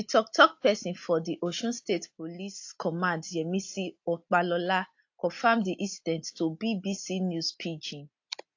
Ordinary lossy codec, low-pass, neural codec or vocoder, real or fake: AAC, 48 kbps; 7.2 kHz; none; real